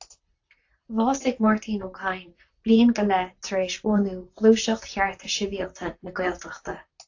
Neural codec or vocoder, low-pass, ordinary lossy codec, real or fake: vocoder, 44.1 kHz, 128 mel bands, Pupu-Vocoder; 7.2 kHz; AAC, 48 kbps; fake